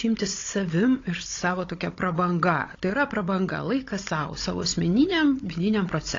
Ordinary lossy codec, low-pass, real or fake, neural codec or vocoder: AAC, 32 kbps; 7.2 kHz; fake; codec, 16 kHz, 16 kbps, FunCodec, trained on Chinese and English, 50 frames a second